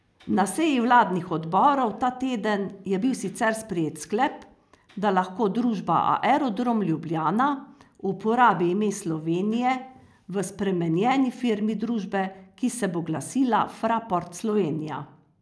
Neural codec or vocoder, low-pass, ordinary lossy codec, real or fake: none; none; none; real